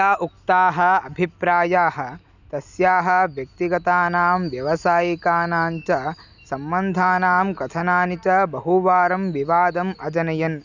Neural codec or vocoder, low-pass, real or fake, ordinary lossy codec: none; 7.2 kHz; real; none